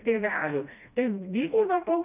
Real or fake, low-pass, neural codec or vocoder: fake; 3.6 kHz; codec, 16 kHz, 1 kbps, FreqCodec, smaller model